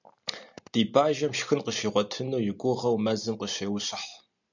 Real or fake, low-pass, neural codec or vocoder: real; 7.2 kHz; none